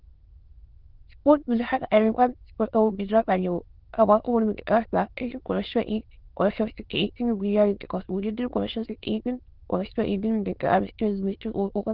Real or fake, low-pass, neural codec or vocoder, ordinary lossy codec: fake; 5.4 kHz; autoencoder, 22.05 kHz, a latent of 192 numbers a frame, VITS, trained on many speakers; Opus, 16 kbps